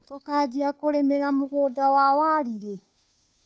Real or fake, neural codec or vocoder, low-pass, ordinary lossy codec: fake; codec, 16 kHz, 2 kbps, FunCodec, trained on Chinese and English, 25 frames a second; none; none